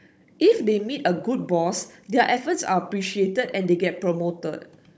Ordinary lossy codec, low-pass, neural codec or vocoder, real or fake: none; none; codec, 16 kHz, 16 kbps, FunCodec, trained on Chinese and English, 50 frames a second; fake